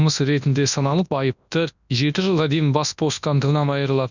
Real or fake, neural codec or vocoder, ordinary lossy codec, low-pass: fake; codec, 24 kHz, 0.9 kbps, WavTokenizer, large speech release; none; 7.2 kHz